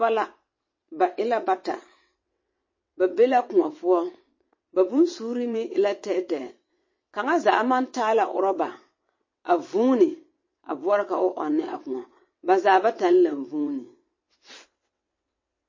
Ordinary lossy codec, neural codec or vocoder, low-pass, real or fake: MP3, 32 kbps; vocoder, 22.05 kHz, 80 mel bands, WaveNeXt; 7.2 kHz; fake